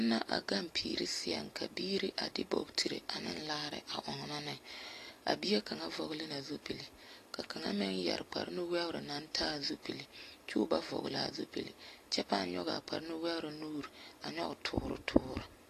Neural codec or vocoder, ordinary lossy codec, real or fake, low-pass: none; AAC, 48 kbps; real; 14.4 kHz